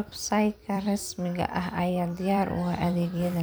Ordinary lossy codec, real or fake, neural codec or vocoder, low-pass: none; fake; vocoder, 44.1 kHz, 128 mel bands every 256 samples, BigVGAN v2; none